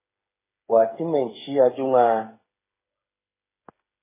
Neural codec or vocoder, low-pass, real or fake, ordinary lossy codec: codec, 16 kHz, 8 kbps, FreqCodec, smaller model; 3.6 kHz; fake; MP3, 16 kbps